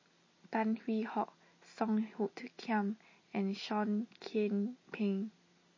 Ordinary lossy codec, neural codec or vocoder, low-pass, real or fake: MP3, 32 kbps; none; 7.2 kHz; real